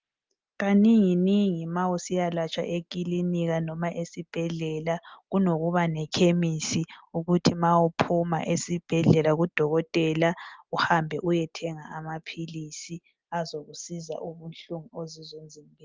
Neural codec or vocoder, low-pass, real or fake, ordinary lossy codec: none; 7.2 kHz; real; Opus, 32 kbps